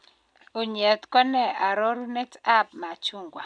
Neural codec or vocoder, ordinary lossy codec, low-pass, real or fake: none; none; 9.9 kHz; real